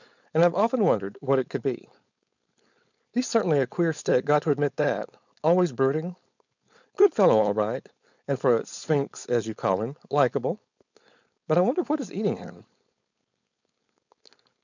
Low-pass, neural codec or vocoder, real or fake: 7.2 kHz; codec, 16 kHz, 4.8 kbps, FACodec; fake